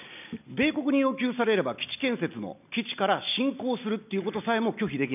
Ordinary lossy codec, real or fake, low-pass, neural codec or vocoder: none; real; 3.6 kHz; none